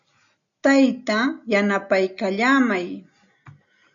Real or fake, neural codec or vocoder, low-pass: real; none; 7.2 kHz